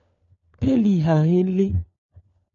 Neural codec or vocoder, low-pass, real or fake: codec, 16 kHz, 4 kbps, FunCodec, trained on LibriTTS, 50 frames a second; 7.2 kHz; fake